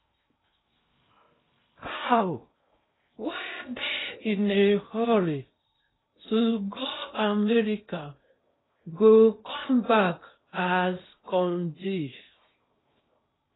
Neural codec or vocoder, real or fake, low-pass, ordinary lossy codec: codec, 16 kHz in and 24 kHz out, 0.6 kbps, FocalCodec, streaming, 2048 codes; fake; 7.2 kHz; AAC, 16 kbps